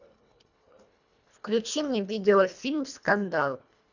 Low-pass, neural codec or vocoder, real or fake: 7.2 kHz; codec, 24 kHz, 1.5 kbps, HILCodec; fake